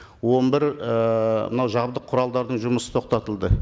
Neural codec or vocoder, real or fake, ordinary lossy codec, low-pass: none; real; none; none